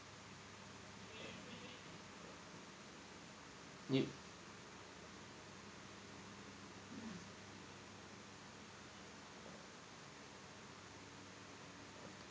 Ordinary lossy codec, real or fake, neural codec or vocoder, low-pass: none; real; none; none